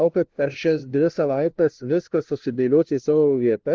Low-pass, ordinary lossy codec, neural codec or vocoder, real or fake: 7.2 kHz; Opus, 16 kbps; codec, 16 kHz, 0.5 kbps, FunCodec, trained on LibriTTS, 25 frames a second; fake